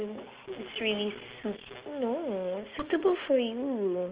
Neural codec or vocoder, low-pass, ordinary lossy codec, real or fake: none; 3.6 kHz; Opus, 16 kbps; real